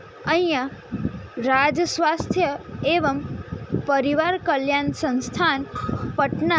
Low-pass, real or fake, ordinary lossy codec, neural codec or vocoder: none; real; none; none